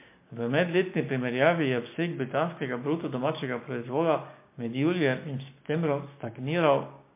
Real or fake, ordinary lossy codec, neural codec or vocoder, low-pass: fake; MP3, 24 kbps; codec, 16 kHz, 6 kbps, DAC; 3.6 kHz